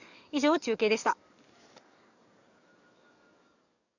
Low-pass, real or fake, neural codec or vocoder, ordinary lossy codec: 7.2 kHz; fake; codec, 44.1 kHz, 7.8 kbps, DAC; none